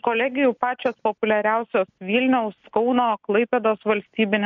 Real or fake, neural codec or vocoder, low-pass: real; none; 7.2 kHz